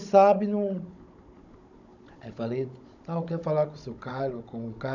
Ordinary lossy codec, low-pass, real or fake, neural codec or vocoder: none; 7.2 kHz; fake; codec, 16 kHz, 16 kbps, FunCodec, trained on Chinese and English, 50 frames a second